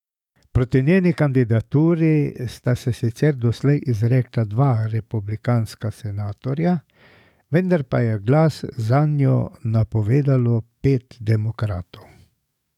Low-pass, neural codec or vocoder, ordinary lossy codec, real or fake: 19.8 kHz; codec, 44.1 kHz, 7.8 kbps, DAC; none; fake